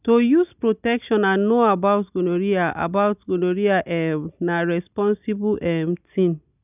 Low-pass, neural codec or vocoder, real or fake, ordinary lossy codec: 3.6 kHz; none; real; none